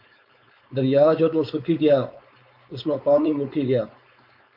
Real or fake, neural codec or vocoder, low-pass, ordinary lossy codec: fake; codec, 16 kHz, 4.8 kbps, FACodec; 5.4 kHz; MP3, 48 kbps